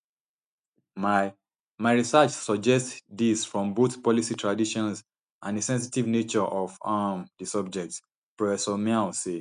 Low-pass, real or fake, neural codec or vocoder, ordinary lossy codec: 9.9 kHz; real; none; none